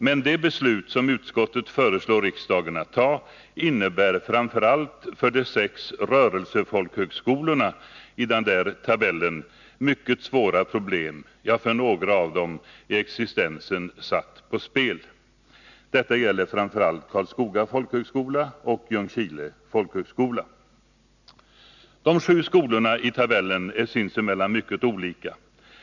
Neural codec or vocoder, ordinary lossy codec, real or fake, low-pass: none; none; real; 7.2 kHz